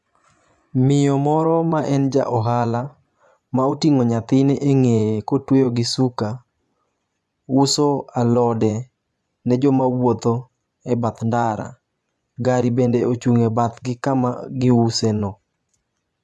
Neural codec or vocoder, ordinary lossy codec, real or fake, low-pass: vocoder, 24 kHz, 100 mel bands, Vocos; none; fake; none